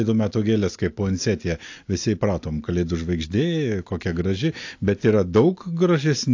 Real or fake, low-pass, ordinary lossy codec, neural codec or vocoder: real; 7.2 kHz; AAC, 48 kbps; none